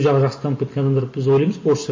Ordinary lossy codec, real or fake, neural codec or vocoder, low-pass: MP3, 32 kbps; real; none; 7.2 kHz